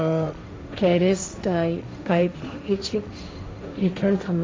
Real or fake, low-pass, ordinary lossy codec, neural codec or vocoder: fake; none; none; codec, 16 kHz, 1.1 kbps, Voila-Tokenizer